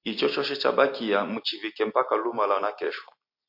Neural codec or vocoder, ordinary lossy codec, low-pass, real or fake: none; MP3, 32 kbps; 5.4 kHz; real